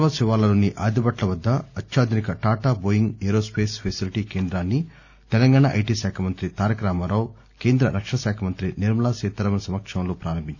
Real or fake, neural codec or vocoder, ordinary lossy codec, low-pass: real; none; none; none